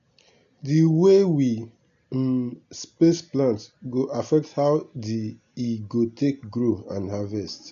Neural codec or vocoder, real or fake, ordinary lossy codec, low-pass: none; real; AAC, 96 kbps; 7.2 kHz